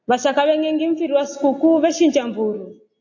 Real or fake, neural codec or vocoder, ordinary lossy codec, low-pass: real; none; AAC, 48 kbps; 7.2 kHz